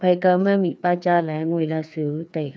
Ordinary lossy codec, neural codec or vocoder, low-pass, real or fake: none; codec, 16 kHz, 2 kbps, FreqCodec, larger model; none; fake